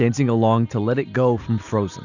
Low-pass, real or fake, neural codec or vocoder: 7.2 kHz; real; none